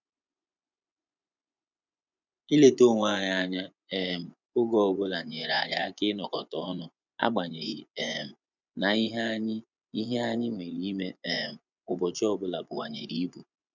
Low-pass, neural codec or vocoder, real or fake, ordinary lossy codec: 7.2 kHz; vocoder, 24 kHz, 100 mel bands, Vocos; fake; none